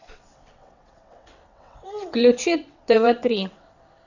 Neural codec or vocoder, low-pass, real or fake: vocoder, 22.05 kHz, 80 mel bands, WaveNeXt; 7.2 kHz; fake